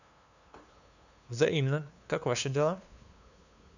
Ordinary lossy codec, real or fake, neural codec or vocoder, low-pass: none; fake; codec, 16 kHz, 2 kbps, FunCodec, trained on LibriTTS, 25 frames a second; 7.2 kHz